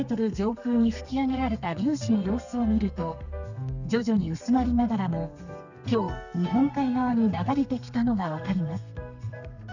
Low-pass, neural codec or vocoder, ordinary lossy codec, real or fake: 7.2 kHz; codec, 32 kHz, 1.9 kbps, SNAC; none; fake